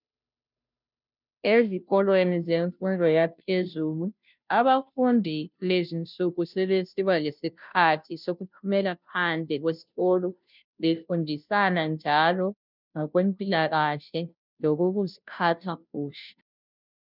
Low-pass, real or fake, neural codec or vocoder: 5.4 kHz; fake; codec, 16 kHz, 0.5 kbps, FunCodec, trained on Chinese and English, 25 frames a second